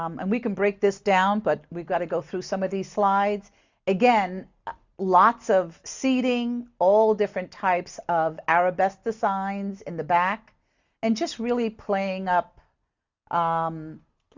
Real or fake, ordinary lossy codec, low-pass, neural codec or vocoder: real; Opus, 64 kbps; 7.2 kHz; none